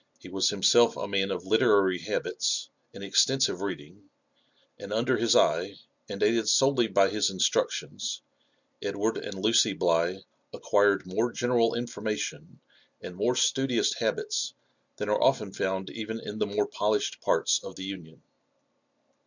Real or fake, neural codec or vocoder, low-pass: real; none; 7.2 kHz